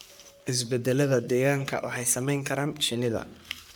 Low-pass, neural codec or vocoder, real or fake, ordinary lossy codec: none; codec, 44.1 kHz, 3.4 kbps, Pupu-Codec; fake; none